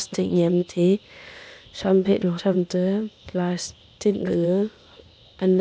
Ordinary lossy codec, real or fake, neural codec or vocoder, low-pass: none; fake; codec, 16 kHz, 0.8 kbps, ZipCodec; none